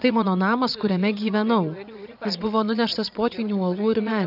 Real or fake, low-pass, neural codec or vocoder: fake; 5.4 kHz; vocoder, 22.05 kHz, 80 mel bands, Vocos